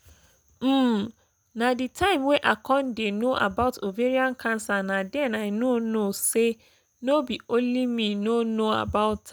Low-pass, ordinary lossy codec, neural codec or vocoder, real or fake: none; none; none; real